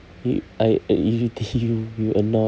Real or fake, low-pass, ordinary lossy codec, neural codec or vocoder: real; none; none; none